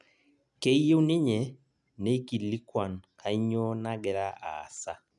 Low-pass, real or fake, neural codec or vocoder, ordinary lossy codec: 10.8 kHz; real; none; none